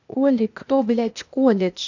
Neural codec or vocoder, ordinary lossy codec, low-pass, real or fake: codec, 16 kHz, 0.8 kbps, ZipCodec; none; 7.2 kHz; fake